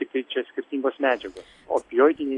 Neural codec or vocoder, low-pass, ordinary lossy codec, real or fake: none; 10.8 kHz; AAC, 48 kbps; real